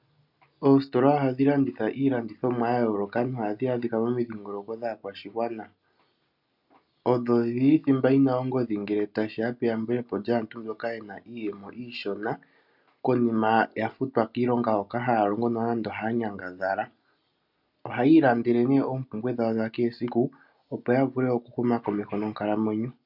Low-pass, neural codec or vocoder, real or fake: 5.4 kHz; none; real